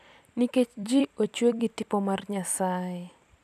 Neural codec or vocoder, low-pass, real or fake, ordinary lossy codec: vocoder, 44.1 kHz, 128 mel bands every 256 samples, BigVGAN v2; 14.4 kHz; fake; none